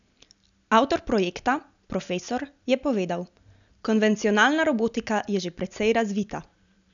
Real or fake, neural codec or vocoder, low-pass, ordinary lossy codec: real; none; 7.2 kHz; none